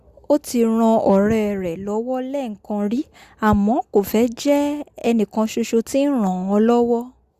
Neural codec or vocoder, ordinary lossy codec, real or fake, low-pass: none; Opus, 64 kbps; real; 14.4 kHz